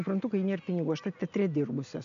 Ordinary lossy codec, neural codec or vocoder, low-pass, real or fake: MP3, 64 kbps; none; 7.2 kHz; real